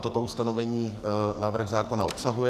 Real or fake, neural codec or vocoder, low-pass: fake; codec, 44.1 kHz, 2.6 kbps, SNAC; 14.4 kHz